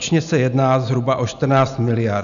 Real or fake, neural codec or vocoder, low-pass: real; none; 7.2 kHz